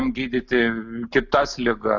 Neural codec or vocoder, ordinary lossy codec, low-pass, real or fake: none; Opus, 64 kbps; 7.2 kHz; real